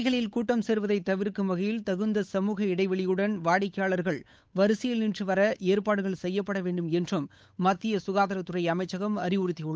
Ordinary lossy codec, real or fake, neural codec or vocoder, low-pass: none; fake; codec, 16 kHz, 8 kbps, FunCodec, trained on Chinese and English, 25 frames a second; none